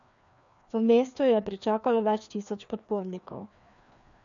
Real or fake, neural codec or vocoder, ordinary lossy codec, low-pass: fake; codec, 16 kHz, 2 kbps, FreqCodec, larger model; none; 7.2 kHz